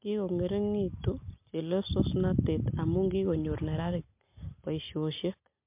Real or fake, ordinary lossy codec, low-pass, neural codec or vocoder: real; MP3, 32 kbps; 3.6 kHz; none